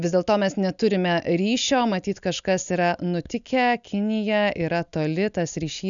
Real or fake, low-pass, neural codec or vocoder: real; 7.2 kHz; none